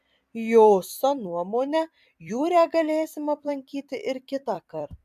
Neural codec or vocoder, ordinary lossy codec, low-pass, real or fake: vocoder, 44.1 kHz, 128 mel bands every 256 samples, BigVGAN v2; AAC, 96 kbps; 14.4 kHz; fake